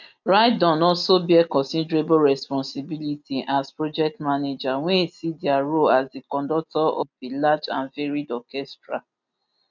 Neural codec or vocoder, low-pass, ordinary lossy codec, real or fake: none; 7.2 kHz; none; real